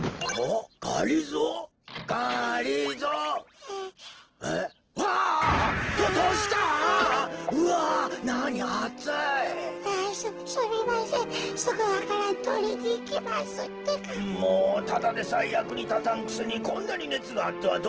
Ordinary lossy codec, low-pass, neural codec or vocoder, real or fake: Opus, 16 kbps; 7.2 kHz; none; real